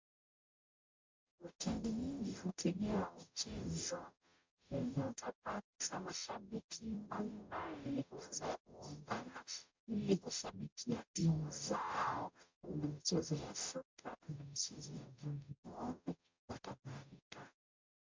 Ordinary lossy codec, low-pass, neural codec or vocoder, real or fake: MP3, 64 kbps; 7.2 kHz; codec, 44.1 kHz, 0.9 kbps, DAC; fake